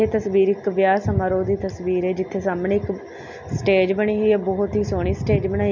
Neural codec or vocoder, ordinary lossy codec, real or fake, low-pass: none; MP3, 64 kbps; real; 7.2 kHz